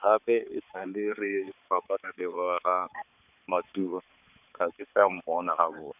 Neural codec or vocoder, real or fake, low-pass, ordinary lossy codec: codec, 16 kHz, 4 kbps, X-Codec, HuBERT features, trained on balanced general audio; fake; 3.6 kHz; none